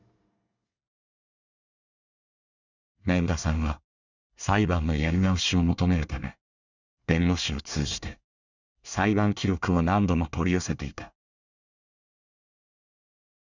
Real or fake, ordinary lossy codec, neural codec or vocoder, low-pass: fake; none; codec, 24 kHz, 1 kbps, SNAC; 7.2 kHz